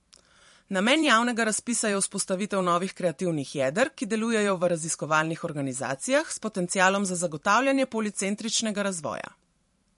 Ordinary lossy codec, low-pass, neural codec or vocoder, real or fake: MP3, 48 kbps; 14.4 kHz; vocoder, 44.1 kHz, 128 mel bands every 512 samples, BigVGAN v2; fake